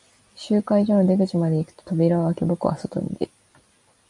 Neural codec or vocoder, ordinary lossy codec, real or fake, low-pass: none; MP3, 96 kbps; real; 10.8 kHz